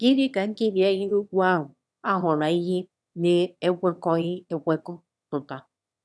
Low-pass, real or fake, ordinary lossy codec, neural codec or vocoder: none; fake; none; autoencoder, 22.05 kHz, a latent of 192 numbers a frame, VITS, trained on one speaker